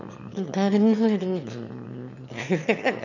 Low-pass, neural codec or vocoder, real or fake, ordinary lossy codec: 7.2 kHz; autoencoder, 22.05 kHz, a latent of 192 numbers a frame, VITS, trained on one speaker; fake; none